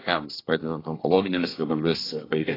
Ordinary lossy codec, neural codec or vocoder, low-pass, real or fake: AAC, 24 kbps; codec, 24 kHz, 1 kbps, SNAC; 5.4 kHz; fake